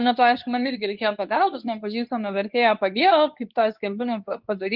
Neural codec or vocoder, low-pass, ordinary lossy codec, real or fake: codec, 24 kHz, 0.9 kbps, WavTokenizer, medium speech release version 2; 5.4 kHz; Opus, 24 kbps; fake